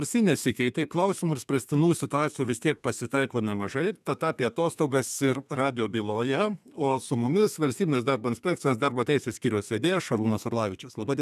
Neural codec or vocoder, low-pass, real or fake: codec, 32 kHz, 1.9 kbps, SNAC; 14.4 kHz; fake